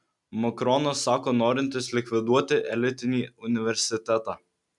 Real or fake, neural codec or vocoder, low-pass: real; none; 10.8 kHz